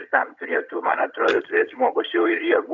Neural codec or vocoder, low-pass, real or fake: vocoder, 22.05 kHz, 80 mel bands, HiFi-GAN; 7.2 kHz; fake